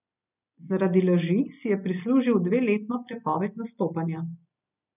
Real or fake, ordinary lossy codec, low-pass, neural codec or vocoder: real; none; 3.6 kHz; none